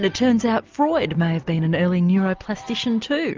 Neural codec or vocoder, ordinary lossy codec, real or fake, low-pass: none; Opus, 24 kbps; real; 7.2 kHz